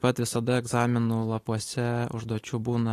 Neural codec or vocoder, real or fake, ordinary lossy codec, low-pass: none; real; AAC, 48 kbps; 14.4 kHz